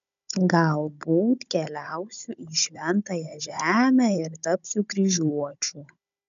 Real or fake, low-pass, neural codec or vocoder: fake; 7.2 kHz; codec, 16 kHz, 16 kbps, FunCodec, trained on Chinese and English, 50 frames a second